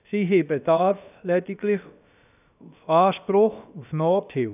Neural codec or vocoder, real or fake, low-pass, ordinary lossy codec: codec, 16 kHz, about 1 kbps, DyCAST, with the encoder's durations; fake; 3.6 kHz; none